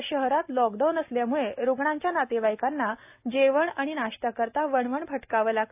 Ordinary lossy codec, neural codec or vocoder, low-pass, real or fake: AAC, 32 kbps; none; 3.6 kHz; real